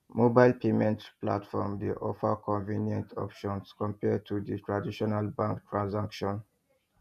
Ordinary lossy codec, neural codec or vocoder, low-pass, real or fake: none; vocoder, 48 kHz, 128 mel bands, Vocos; 14.4 kHz; fake